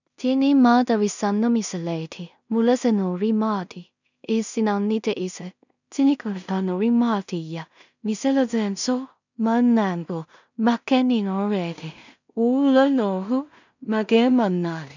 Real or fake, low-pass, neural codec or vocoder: fake; 7.2 kHz; codec, 16 kHz in and 24 kHz out, 0.4 kbps, LongCat-Audio-Codec, two codebook decoder